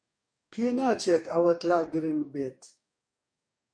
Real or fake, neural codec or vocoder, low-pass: fake; codec, 44.1 kHz, 2.6 kbps, DAC; 9.9 kHz